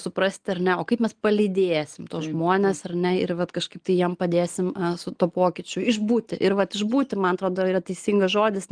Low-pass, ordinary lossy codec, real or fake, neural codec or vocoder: 9.9 kHz; Opus, 24 kbps; real; none